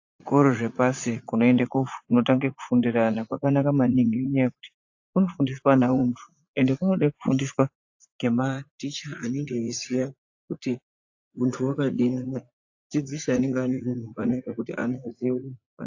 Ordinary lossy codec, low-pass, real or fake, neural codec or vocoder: AAC, 48 kbps; 7.2 kHz; fake; vocoder, 44.1 kHz, 80 mel bands, Vocos